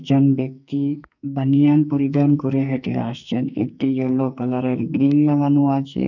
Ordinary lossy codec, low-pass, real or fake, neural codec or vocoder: none; 7.2 kHz; fake; codec, 44.1 kHz, 2.6 kbps, SNAC